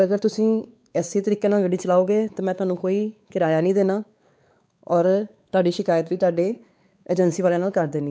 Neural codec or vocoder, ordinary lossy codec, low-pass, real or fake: codec, 16 kHz, 4 kbps, X-Codec, WavLM features, trained on Multilingual LibriSpeech; none; none; fake